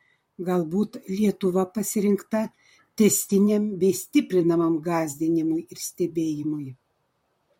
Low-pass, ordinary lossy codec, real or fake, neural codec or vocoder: 19.8 kHz; MP3, 64 kbps; fake; vocoder, 44.1 kHz, 128 mel bands, Pupu-Vocoder